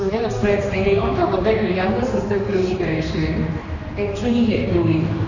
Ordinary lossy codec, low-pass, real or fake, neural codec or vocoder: none; 7.2 kHz; fake; codec, 16 kHz, 2 kbps, X-Codec, HuBERT features, trained on general audio